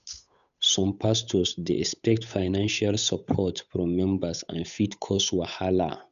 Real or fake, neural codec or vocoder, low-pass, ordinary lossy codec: fake; codec, 16 kHz, 8 kbps, FunCodec, trained on Chinese and English, 25 frames a second; 7.2 kHz; none